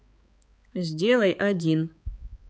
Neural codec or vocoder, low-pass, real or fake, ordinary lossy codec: codec, 16 kHz, 4 kbps, X-Codec, HuBERT features, trained on balanced general audio; none; fake; none